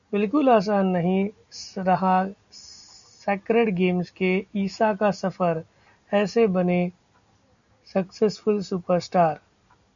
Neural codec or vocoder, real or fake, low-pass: none; real; 7.2 kHz